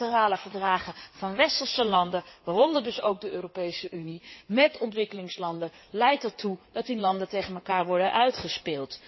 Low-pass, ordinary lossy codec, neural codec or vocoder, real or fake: 7.2 kHz; MP3, 24 kbps; codec, 16 kHz in and 24 kHz out, 2.2 kbps, FireRedTTS-2 codec; fake